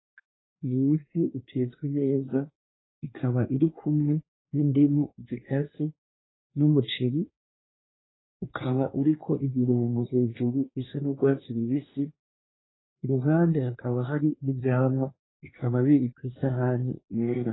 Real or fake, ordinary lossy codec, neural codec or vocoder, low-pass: fake; AAC, 16 kbps; codec, 24 kHz, 1 kbps, SNAC; 7.2 kHz